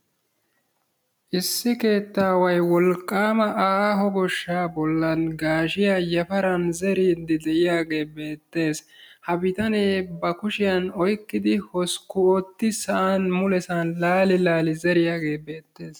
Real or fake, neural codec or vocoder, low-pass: real; none; 19.8 kHz